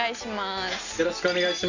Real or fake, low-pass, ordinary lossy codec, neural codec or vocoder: real; 7.2 kHz; none; none